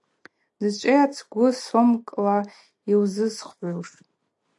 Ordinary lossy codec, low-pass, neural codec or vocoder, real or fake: AAC, 64 kbps; 10.8 kHz; none; real